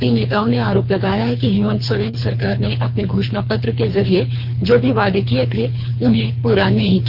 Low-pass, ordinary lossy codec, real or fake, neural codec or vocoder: 5.4 kHz; none; fake; codec, 24 kHz, 3 kbps, HILCodec